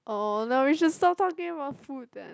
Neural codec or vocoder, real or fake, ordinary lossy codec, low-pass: none; real; none; none